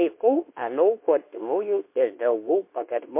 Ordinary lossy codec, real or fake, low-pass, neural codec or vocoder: MP3, 24 kbps; fake; 3.6 kHz; codec, 24 kHz, 0.9 kbps, WavTokenizer, medium speech release version 2